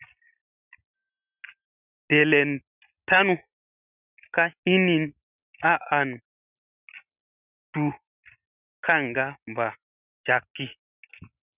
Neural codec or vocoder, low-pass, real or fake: none; 3.6 kHz; real